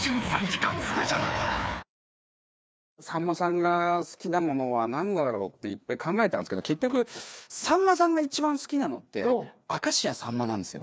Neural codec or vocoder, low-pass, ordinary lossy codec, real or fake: codec, 16 kHz, 2 kbps, FreqCodec, larger model; none; none; fake